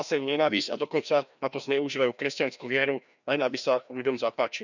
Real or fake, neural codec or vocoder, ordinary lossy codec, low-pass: fake; codec, 16 kHz, 1 kbps, FreqCodec, larger model; none; 7.2 kHz